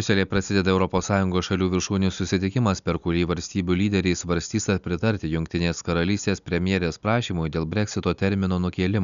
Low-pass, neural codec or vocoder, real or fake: 7.2 kHz; none; real